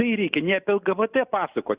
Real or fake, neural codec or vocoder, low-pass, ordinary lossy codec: real; none; 3.6 kHz; Opus, 64 kbps